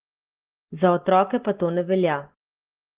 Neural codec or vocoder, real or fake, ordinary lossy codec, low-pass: none; real; Opus, 16 kbps; 3.6 kHz